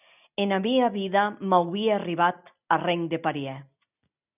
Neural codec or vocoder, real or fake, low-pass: none; real; 3.6 kHz